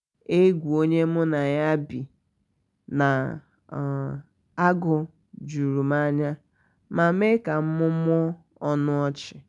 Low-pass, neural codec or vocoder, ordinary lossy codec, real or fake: 10.8 kHz; none; none; real